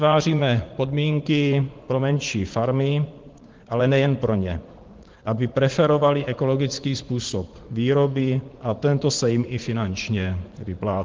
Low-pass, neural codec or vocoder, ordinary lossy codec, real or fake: 7.2 kHz; vocoder, 44.1 kHz, 80 mel bands, Vocos; Opus, 16 kbps; fake